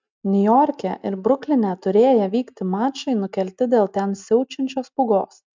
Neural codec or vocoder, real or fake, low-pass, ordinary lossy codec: none; real; 7.2 kHz; MP3, 64 kbps